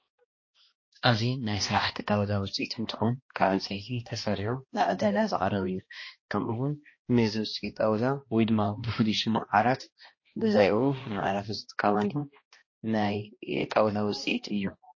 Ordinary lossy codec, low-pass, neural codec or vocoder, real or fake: MP3, 32 kbps; 7.2 kHz; codec, 16 kHz, 1 kbps, X-Codec, HuBERT features, trained on balanced general audio; fake